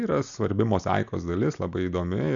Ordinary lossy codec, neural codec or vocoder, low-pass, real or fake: MP3, 96 kbps; none; 7.2 kHz; real